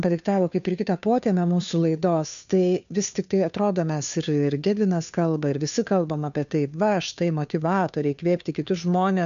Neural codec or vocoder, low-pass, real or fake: codec, 16 kHz, 2 kbps, FunCodec, trained on Chinese and English, 25 frames a second; 7.2 kHz; fake